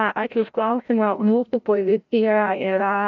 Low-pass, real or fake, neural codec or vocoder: 7.2 kHz; fake; codec, 16 kHz, 0.5 kbps, FreqCodec, larger model